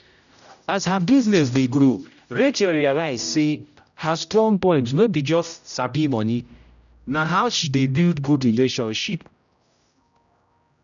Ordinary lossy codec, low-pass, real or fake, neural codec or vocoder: none; 7.2 kHz; fake; codec, 16 kHz, 0.5 kbps, X-Codec, HuBERT features, trained on general audio